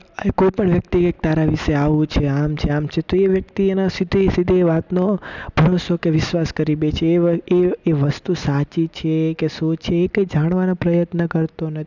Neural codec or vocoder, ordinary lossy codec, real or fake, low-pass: none; none; real; 7.2 kHz